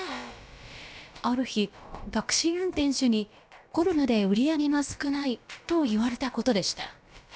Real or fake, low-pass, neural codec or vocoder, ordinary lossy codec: fake; none; codec, 16 kHz, about 1 kbps, DyCAST, with the encoder's durations; none